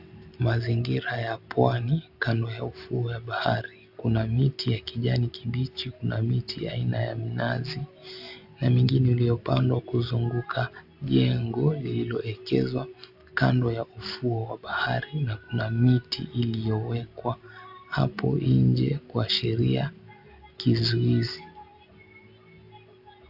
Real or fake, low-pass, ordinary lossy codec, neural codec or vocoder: real; 5.4 kHz; AAC, 48 kbps; none